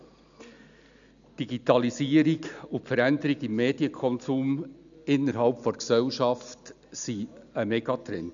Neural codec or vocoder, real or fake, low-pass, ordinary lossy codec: none; real; 7.2 kHz; none